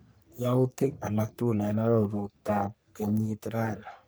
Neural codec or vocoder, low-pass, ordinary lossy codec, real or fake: codec, 44.1 kHz, 3.4 kbps, Pupu-Codec; none; none; fake